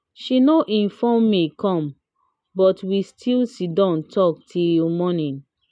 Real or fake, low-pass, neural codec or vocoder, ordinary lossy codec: real; 9.9 kHz; none; none